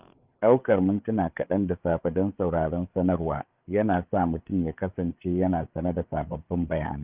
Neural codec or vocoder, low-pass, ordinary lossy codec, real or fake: codec, 16 kHz, 8 kbps, FreqCodec, larger model; 3.6 kHz; Opus, 24 kbps; fake